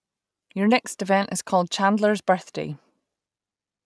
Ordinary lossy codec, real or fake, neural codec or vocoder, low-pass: none; real; none; none